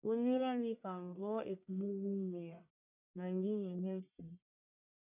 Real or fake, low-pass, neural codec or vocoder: fake; 3.6 kHz; codec, 44.1 kHz, 1.7 kbps, Pupu-Codec